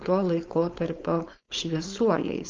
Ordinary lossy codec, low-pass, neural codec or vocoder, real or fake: Opus, 24 kbps; 7.2 kHz; codec, 16 kHz, 4.8 kbps, FACodec; fake